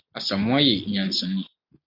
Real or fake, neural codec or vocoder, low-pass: real; none; 5.4 kHz